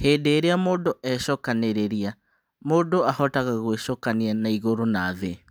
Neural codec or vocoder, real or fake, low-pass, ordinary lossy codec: none; real; none; none